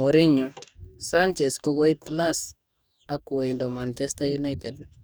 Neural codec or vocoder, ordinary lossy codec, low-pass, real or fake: codec, 44.1 kHz, 2.6 kbps, DAC; none; none; fake